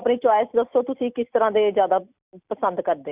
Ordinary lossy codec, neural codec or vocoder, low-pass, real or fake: Opus, 64 kbps; none; 3.6 kHz; real